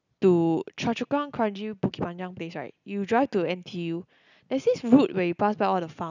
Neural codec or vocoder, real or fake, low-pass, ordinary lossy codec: none; real; 7.2 kHz; none